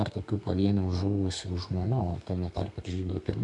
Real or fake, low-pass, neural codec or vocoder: fake; 10.8 kHz; codec, 32 kHz, 1.9 kbps, SNAC